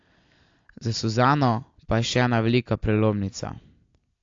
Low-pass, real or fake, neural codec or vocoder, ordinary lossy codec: 7.2 kHz; real; none; AAC, 48 kbps